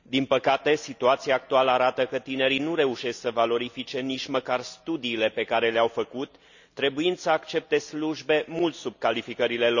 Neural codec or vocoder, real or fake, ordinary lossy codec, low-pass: none; real; none; 7.2 kHz